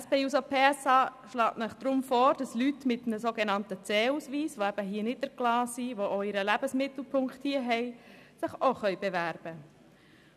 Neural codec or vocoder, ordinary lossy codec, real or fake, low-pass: none; none; real; 14.4 kHz